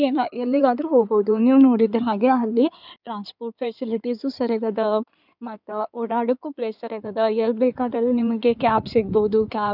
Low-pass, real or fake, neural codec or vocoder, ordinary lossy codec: 5.4 kHz; fake; codec, 16 kHz in and 24 kHz out, 2.2 kbps, FireRedTTS-2 codec; none